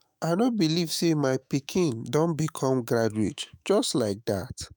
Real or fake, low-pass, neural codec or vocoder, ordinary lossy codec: fake; none; autoencoder, 48 kHz, 128 numbers a frame, DAC-VAE, trained on Japanese speech; none